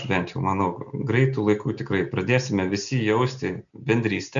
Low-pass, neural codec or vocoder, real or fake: 7.2 kHz; none; real